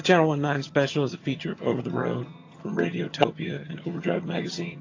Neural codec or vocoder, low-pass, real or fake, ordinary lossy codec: vocoder, 22.05 kHz, 80 mel bands, HiFi-GAN; 7.2 kHz; fake; AAC, 32 kbps